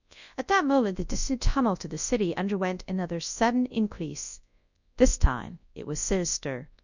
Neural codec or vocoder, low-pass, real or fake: codec, 24 kHz, 0.9 kbps, WavTokenizer, large speech release; 7.2 kHz; fake